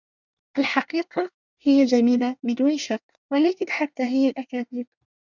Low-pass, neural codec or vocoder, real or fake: 7.2 kHz; codec, 24 kHz, 1 kbps, SNAC; fake